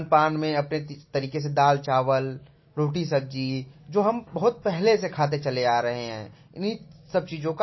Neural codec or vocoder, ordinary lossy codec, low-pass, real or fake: none; MP3, 24 kbps; 7.2 kHz; real